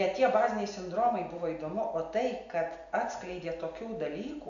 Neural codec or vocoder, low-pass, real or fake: none; 7.2 kHz; real